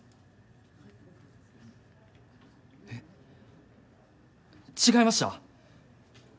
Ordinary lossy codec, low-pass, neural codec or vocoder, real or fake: none; none; none; real